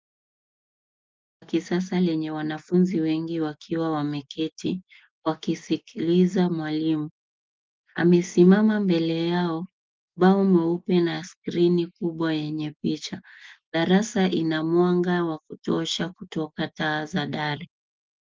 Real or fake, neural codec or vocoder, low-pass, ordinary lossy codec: real; none; 7.2 kHz; Opus, 32 kbps